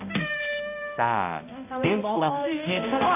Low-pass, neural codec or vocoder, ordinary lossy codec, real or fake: 3.6 kHz; codec, 16 kHz, 0.5 kbps, X-Codec, HuBERT features, trained on balanced general audio; none; fake